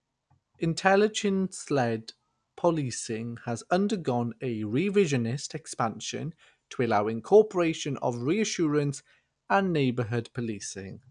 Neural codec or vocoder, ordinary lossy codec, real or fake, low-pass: none; none; real; 9.9 kHz